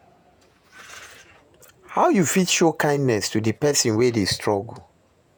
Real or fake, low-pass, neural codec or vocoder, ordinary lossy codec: fake; none; vocoder, 48 kHz, 128 mel bands, Vocos; none